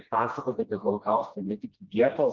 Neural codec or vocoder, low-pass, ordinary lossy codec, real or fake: codec, 16 kHz, 1 kbps, FreqCodec, smaller model; 7.2 kHz; Opus, 32 kbps; fake